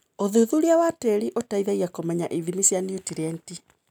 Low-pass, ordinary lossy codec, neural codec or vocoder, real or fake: none; none; none; real